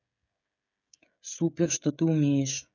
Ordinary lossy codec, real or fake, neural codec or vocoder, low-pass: none; fake; codec, 16 kHz, 16 kbps, FreqCodec, smaller model; 7.2 kHz